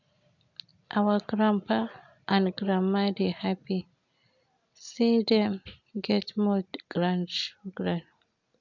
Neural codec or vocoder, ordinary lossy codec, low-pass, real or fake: none; none; 7.2 kHz; real